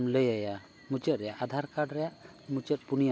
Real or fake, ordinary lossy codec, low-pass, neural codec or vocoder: real; none; none; none